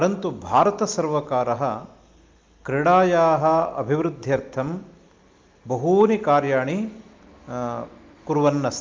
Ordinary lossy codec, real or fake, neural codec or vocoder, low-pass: Opus, 32 kbps; real; none; 7.2 kHz